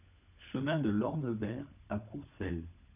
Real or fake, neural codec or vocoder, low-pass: fake; codec, 16 kHz, 16 kbps, FunCodec, trained on LibriTTS, 50 frames a second; 3.6 kHz